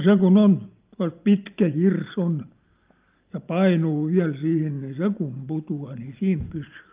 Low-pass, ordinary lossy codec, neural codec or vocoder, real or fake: 3.6 kHz; Opus, 24 kbps; none; real